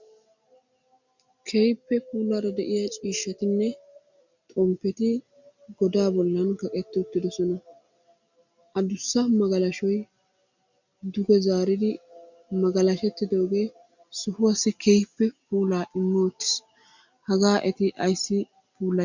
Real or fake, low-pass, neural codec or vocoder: real; 7.2 kHz; none